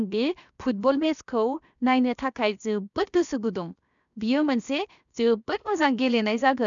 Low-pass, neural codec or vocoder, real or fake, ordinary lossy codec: 7.2 kHz; codec, 16 kHz, 0.7 kbps, FocalCodec; fake; none